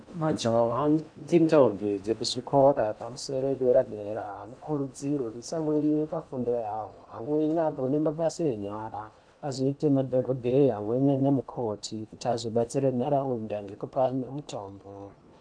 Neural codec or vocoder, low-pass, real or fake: codec, 16 kHz in and 24 kHz out, 0.8 kbps, FocalCodec, streaming, 65536 codes; 9.9 kHz; fake